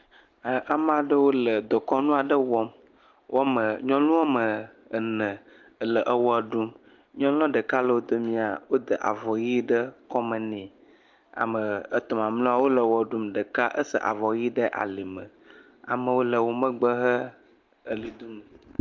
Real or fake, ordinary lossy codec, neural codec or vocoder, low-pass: real; Opus, 24 kbps; none; 7.2 kHz